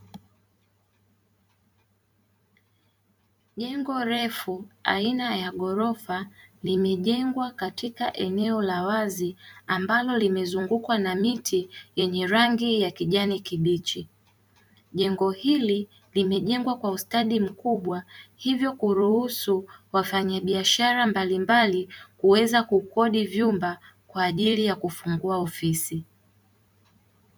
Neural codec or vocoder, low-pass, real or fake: vocoder, 44.1 kHz, 128 mel bands every 256 samples, BigVGAN v2; 19.8 kHz; fake